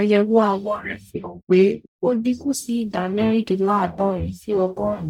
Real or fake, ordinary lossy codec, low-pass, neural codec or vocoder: fake; none; 19.8 kHz; codec, 44.1 kHz, 0.9 kbps, DAC